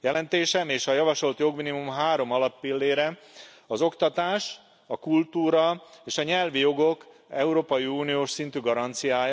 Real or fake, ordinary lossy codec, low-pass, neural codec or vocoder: real; none; none; none